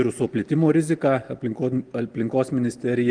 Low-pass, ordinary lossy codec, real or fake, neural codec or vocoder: 9.9 kHz; Opus, 24 kbps; real; none